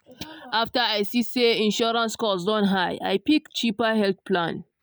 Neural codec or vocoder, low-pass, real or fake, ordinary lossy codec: none; none; real; none